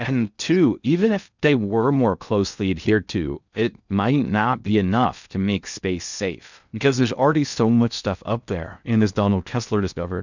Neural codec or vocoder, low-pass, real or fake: codec, 16 kHz in and 24 kHz out, 0.6 kbps, FocalCodec, streaming, 2048 codes; 7.2 kHz; fake